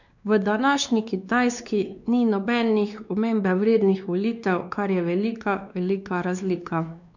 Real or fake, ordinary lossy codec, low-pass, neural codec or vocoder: fake; none; 7.2 kHz; codec, 16 kHz, 2 kbps, X-Codec, WavLM features, trained on Multilingual LibriSpeech